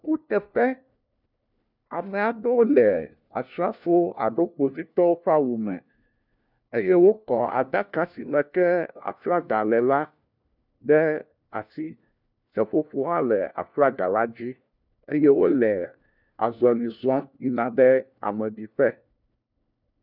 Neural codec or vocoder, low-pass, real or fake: codec, 16 kHz, 1 kbps, FunCodec, trained on LibriTTS, 50 frames a second; 5.4 kHz; fake